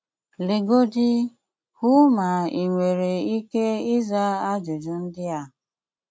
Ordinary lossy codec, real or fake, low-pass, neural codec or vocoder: none; real; none; none